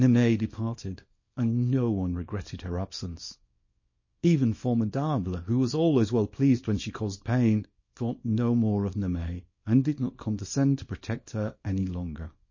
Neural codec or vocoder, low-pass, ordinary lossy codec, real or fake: codec, 24 kHz, 0.9 kbps, WavTokenizer, small release; 7.2 kHz; MP3, 32 kbps; fake